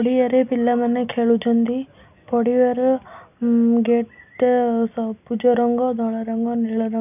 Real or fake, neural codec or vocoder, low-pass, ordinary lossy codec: real; none; 3.6 kHz; none